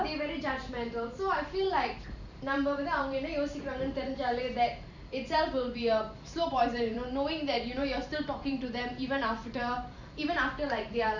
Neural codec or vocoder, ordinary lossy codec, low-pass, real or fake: vocoder, 44.1 kHz, 128 mel bands every 512 samples, BigVGAN v2; none; 7.2 kHz; fake